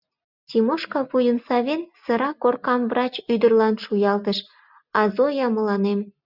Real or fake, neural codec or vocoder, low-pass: real; none; 5.4 kHz